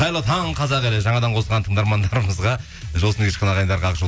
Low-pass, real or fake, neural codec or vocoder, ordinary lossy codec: none; real; none; none